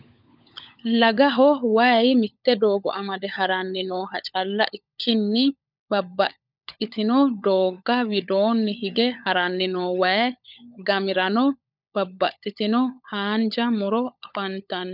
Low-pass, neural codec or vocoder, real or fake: 5.4 kHz; codec, 16 kHz, 16 kbps, FunCodec, trained on LibriTTS, 50 frames a second; fake